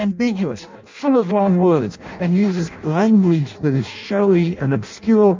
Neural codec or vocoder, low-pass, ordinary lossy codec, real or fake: codec, 16 kHz in and 24 kHz out, 0.6 kbps, FireRedTTS-2 codec; 7.2 kHz; MP3, 64 kbps; fake